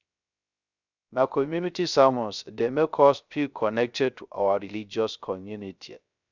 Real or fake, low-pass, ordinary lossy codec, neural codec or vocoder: fake; 7.2 kHz; none; codec, 16 kHz, 0.3 kbps, FocalCodec